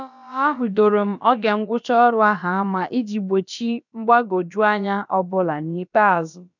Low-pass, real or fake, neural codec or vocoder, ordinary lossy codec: 7.2 kHz; fake; codec, 16 kHz, about 1 kbps, DyCAST, with the encoder's durations; none